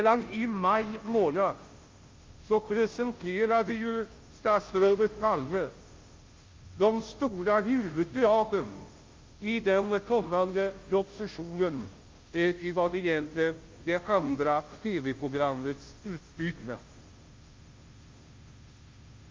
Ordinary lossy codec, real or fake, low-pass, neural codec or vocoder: Opus, 32 kbps; fake; 7.2 kHz; codec, 16 kHz, 0.5 kbps, FunCodec, trained on Chinese and English, 25 frames a second